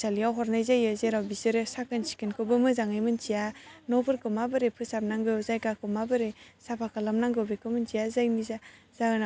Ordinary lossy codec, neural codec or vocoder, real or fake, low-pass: none; none; real; none